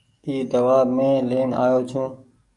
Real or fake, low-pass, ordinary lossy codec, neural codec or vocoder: fake; 10.8 kHz; MP3, 64 kbps; codec, 44.1 kHz, 7.8 kbps, DAC